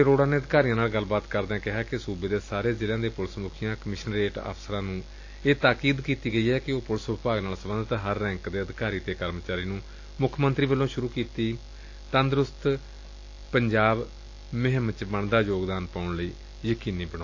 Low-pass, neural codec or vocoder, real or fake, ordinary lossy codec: 7.2 kHz; none; real; AAC, 48 kbps